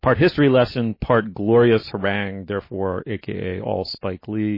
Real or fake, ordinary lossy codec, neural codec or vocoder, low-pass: real; MP3, 24 kbps; none; 5.4 kHz